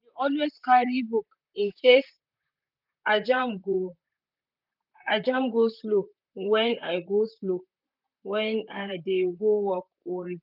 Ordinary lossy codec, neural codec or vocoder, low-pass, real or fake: none; vocoder, 44.1 kHz, 128 mel bands, Pupu-Vocoder; 5.4 kHz; fake